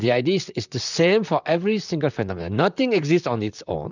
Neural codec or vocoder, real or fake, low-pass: vocoder, 44.1 kHz, 128 mel bands, Pupu-Vocoder; fake; 7.2 kHz